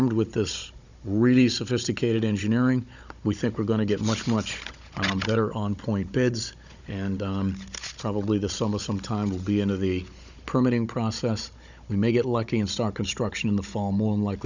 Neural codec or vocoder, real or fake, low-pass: codec, 16 kHz, 16 kbps, FunCodec, trained on Chinese and English, 50 frames a second; fake; 7.2 kHz